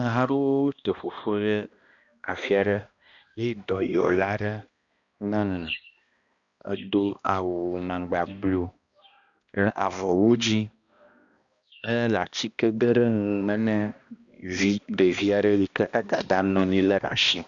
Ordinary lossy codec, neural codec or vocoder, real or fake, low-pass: Opus, 64 kbps; codec, 16 kHz, 1 kbps, X-Codec, HuBERT features, trained on balanced general audio; fake; 7.2 kHz